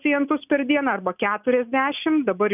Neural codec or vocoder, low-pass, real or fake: none; 3.6 kHz; real